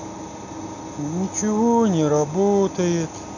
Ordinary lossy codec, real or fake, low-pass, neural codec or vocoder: none; real; 7.2 kHz; none